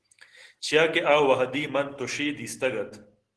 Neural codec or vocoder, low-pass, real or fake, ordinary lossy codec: none; 10.8 kHz; real; Opus, 16 kbps